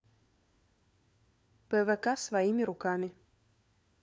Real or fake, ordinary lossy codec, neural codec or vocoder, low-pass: fake; none; codec, 16 kHz, 4 kbps, FunCodec, trained on LibriTTS, 50 frames a second; none